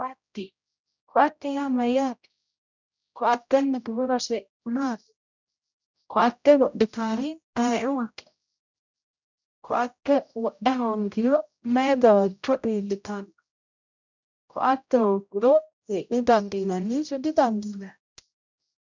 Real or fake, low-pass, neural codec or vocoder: fake; 7.2 kHz; codec, 16 kHz, 0.5 kbps, X-Codec, HuBERT features, trained on general audio